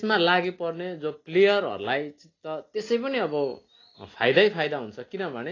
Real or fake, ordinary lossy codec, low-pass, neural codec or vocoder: real; AAC, 32 kbps; 7.2 kHz; none